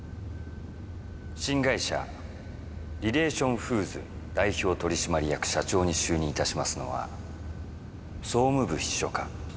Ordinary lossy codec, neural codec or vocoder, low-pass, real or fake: none; none; none; real